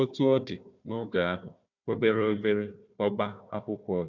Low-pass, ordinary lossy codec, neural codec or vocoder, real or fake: 7.2 kHz; none; codec, 16 kHz, 1 kbps, FunCodec, trained on Chinese and English, 50 frames a second; fake